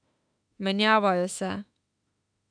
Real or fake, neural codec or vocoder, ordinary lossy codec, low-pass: fake; autoencoder, 48 kHz, 32 numbers a frame, DAC-VAE, trained on Japanese speech; none; 9.9 kHz